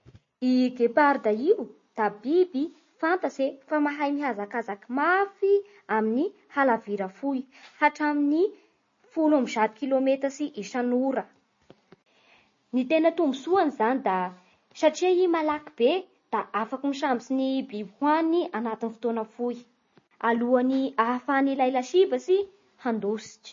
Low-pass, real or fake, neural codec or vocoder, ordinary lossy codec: 7.2 kHz; real; none; MP3, 32 kbps